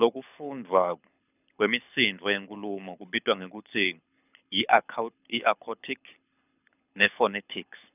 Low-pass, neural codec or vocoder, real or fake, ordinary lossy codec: 3.6 kHz; codec, 24 kHz, 6 kbps, HILCodec; fake; none